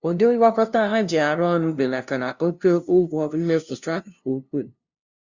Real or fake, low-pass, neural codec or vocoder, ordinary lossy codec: fake; 7.2 kHz; codec, 16 kHz, 0.5 kbps, FunCodec, trained on LibriTTS, 25 frames a second; Opus, 64 kbps